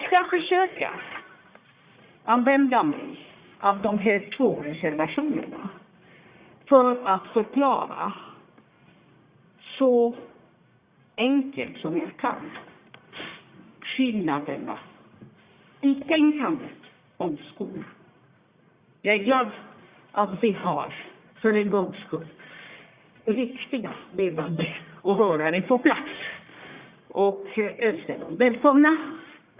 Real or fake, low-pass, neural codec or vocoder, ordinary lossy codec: fake; 3.6 kHz; codec, 44.1 kHz, 1.7 kbps, Pupu-Codec; Opus, 64 kbps